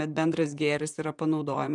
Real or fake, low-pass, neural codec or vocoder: fake; 10.8 kHz; vocoder, 44.1 kHz, 128 mel bands, Pupu-Vocoder